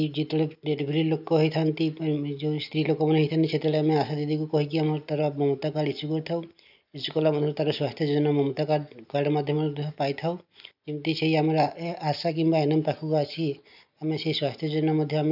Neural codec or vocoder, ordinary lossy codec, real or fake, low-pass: none; none; real; 5.4 kHz